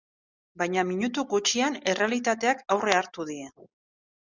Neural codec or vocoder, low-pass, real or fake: none; 7.2 kHz; real